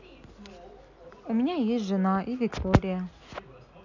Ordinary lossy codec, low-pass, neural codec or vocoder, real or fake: none; 7.2 kHz; none; real